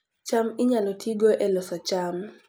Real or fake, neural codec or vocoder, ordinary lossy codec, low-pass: real; none; none; none